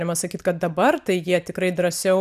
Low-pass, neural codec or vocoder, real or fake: 14.4 kHz; none; real